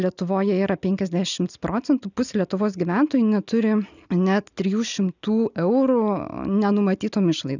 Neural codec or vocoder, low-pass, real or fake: none; 7.2 kHz; real